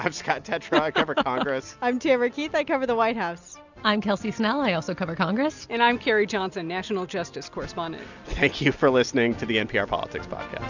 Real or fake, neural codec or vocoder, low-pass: real; none; 7.2 kHz